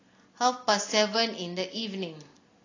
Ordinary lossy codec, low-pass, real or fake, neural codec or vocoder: AAC, 32 kbps; 7.2 kHz; real; none